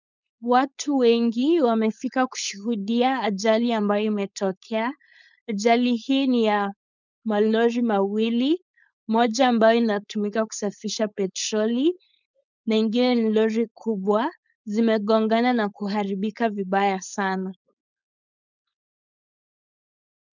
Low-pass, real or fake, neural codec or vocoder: 7.2 kHz; fake; codec, 16 kHz, 4.8 kbps, FACodec